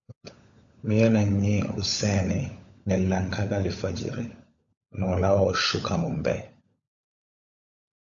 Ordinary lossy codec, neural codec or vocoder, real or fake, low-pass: AAC, 64 kbps; codec, 16 kHz, 16 kbps, FunCodec, trained on LibriTTS, 50 frames a second; fake; 7.2 kHz